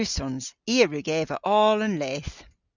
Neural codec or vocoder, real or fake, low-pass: none; real; 7.2 kHz